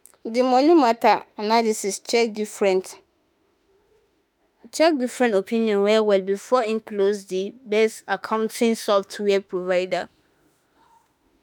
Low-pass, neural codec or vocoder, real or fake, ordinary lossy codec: none; autoencoder, 48 kHz, 32 numbers a frame, DAC-VAE, trained on Japanese speech; fake; none